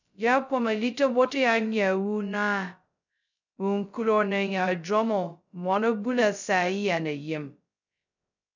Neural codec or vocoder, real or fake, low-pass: codec, 16 kHz, 0.2 kbps, FocalCodec; fake; 7.2 kHz